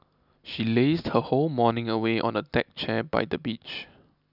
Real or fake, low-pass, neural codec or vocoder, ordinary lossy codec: real; 5.4 kHz; none; none